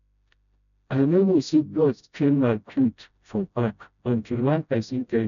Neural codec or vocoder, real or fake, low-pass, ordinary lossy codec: codec, 16 kHz, 0.5 kbps, FreqCodec, smaller model; fake; 7.2 kHz; none